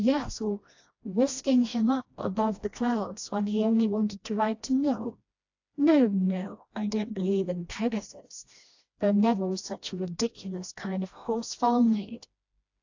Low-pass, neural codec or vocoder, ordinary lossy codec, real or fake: 7.2 kHz; codec, 16 kHz, 1 kbps, FreqCodec, smaller model; AAC, 48 kbps; fake